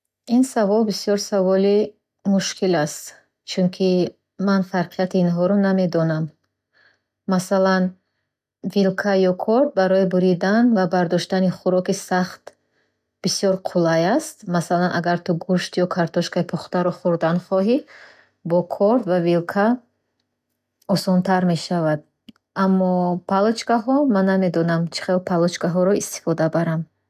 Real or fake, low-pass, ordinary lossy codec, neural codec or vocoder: real; 14.4 kHz; none; none